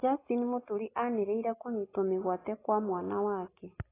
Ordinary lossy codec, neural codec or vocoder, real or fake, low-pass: AAC, 16 kbps; none; real; 3.6 kHz